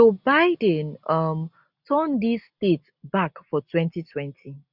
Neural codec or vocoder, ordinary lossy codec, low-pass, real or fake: none; none; 5.4 kHz; real